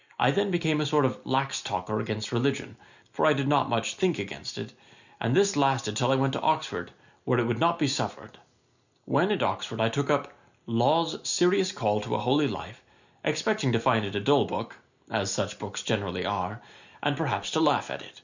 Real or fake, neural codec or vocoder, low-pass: real; none; 7.2 kHz